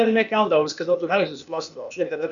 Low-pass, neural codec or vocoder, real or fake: 7.2 kHz; codec, 16 kHz, 0.8 kbps, ZipCodec; fake